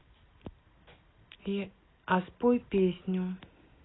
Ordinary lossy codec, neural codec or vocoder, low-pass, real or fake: AAC, 16 kbps; none; 7.2 kHz; real